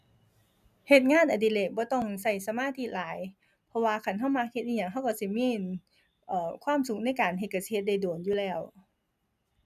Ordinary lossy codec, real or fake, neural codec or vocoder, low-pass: none; real; none; 14.4 kHz